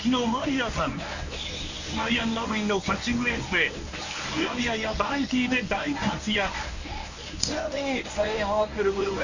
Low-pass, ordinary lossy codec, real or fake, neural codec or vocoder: 7.2 kHz; none; fake; codec, 24 kHz, 0.9 kbps, WavTokenizer, medium speech release version 1